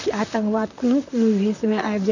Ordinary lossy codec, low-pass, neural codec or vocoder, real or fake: none; 7.2 kHz; vocoder, 44.1 kHz, 128 mel bands, Pupu-Vocoder; fake